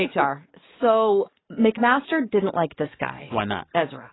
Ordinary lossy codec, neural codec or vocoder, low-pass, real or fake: AAC, 16 kbps; codec, 44.1 kHz, 7.8 kbps, DAC; 7.2 kHz; fake